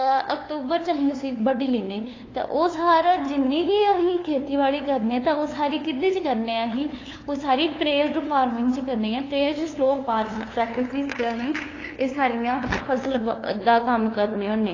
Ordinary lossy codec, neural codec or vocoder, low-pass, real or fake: AAC, 32 kbps; codec, 16 kHz, 2 kbps, FunCodec, trained on LibriTTS, 25 frames a second; 7.2 kHz; fake